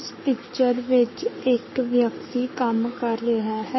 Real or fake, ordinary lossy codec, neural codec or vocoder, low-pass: fake; MP3, 24 kbps; codec, 44.1 kHz, 7.8 kbps, Pupu-Codec; 7.2 kHz